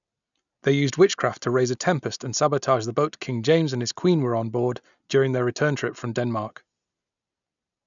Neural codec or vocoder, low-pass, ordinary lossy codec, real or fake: none; 7.2 kHz; none; real